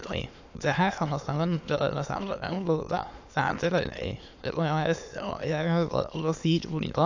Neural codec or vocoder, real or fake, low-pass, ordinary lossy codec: autoencoder, 22.05 kHz, a latent of 192 numbers a frame, VITS, trained on many speakers; fake; 7.2 kHz; AAC, 48 kbps